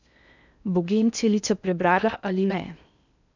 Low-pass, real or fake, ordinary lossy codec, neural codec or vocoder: 7.2 kHz; fake; none; codec, 16 kHz in and 24 kHz out, 0.6 kbps, FocalCodec, streaming, 4096 codes